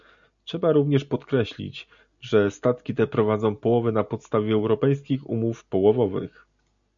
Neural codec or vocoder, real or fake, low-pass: none; real; 7.2 kHz